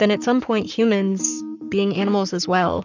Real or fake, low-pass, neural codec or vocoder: fake; 7.2 kHz; codec, 44.1 kHz, 7.8 kbps, Pupu-Codec